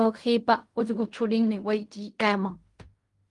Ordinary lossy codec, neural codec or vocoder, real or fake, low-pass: Opus, 32 kbps; codec, 16 kHz in and 24 kHz out, 0.4 kbps, LongCat-Audio-Codec, fine tuned four codebook decoder; fake; 10.8 kHz